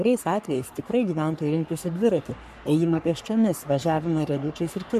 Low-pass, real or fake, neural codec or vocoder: 14.4 kHz; fake; codec, 44.1 kHz, 3.4 kbps, Pupu-Codec